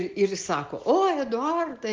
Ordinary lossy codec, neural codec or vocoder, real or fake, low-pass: Opus, 32 kbps; none; real; 7.2 kHz